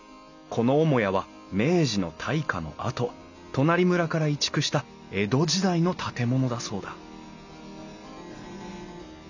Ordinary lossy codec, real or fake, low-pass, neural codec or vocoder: none; real; 7.2 kHz; none